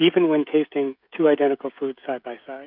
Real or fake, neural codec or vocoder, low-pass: fake; codec, 16 kHz, 16 kbps, FreqCodec, smaller model; 5.4 kHz